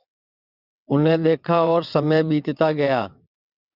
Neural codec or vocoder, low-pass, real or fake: vocoder, 22.05 kHz, 80 mel bands, WaveNeXt; 5.4 kHz; fake